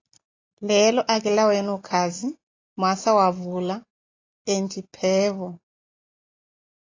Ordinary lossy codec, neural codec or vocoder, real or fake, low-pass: AAC, 32 kbps; none; real; 7.2 kHz